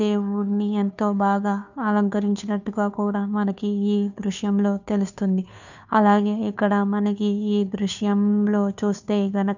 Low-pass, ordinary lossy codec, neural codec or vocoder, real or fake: 7.2 kHz; none; codec, 16 kHz, 2 kbps, FunCodec, trained on Chinese and English, 25 frames a second; fake